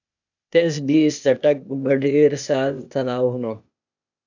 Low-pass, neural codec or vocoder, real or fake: 7.2 kHz; codec, 16 kHz, 0.8 kbps, ZipCodec; fake